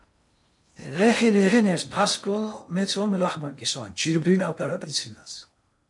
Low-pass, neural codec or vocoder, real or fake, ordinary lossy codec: 10.8 kHz; codec, 16 kHz in and 24 kHz out, 0.6 kbps, FocalCodec, streaming, 2048 codes; fake; MP3, 64 kbps